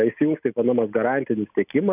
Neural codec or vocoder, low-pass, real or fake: none; 3.6 kHz; real